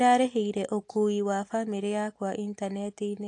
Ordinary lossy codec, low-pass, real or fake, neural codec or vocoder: AAC, 48 kbps; 10.8 kHz; real; none